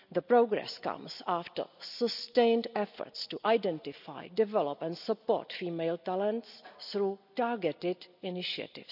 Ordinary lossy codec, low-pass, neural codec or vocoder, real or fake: none; 5.4 kHz; none; real